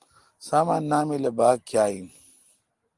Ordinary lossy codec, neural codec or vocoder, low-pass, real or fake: Opus, 24 kbps; none; 10.8 kHz; real